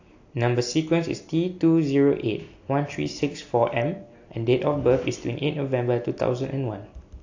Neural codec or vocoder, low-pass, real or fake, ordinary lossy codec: none; 7.2 kHz; real; AAC, 48 kbps